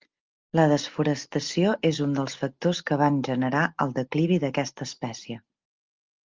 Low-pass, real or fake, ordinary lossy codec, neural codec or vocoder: 7.2 kHz; real; Opus, 32 kbps; none